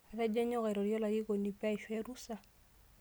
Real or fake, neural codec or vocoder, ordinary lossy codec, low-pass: real; none; none; none